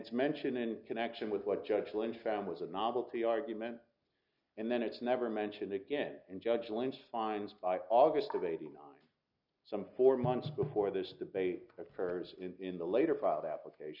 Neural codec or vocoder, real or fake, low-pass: none; real; 5.4 kHz